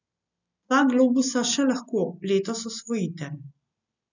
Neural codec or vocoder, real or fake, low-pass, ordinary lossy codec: none; real; 7.2 kHz; none